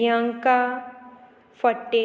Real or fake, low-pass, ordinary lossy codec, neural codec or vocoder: real; none; none; none